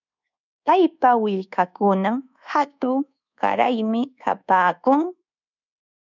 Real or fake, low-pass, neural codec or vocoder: fake; 7.2 kHz; codec, 24 kHz, 1.2 kbps, DualCodec